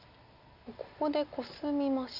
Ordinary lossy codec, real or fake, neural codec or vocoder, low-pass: none; real; none; 5.4 kHz